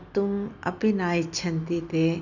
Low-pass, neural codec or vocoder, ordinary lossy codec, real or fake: 7.2 kHz; none; none; real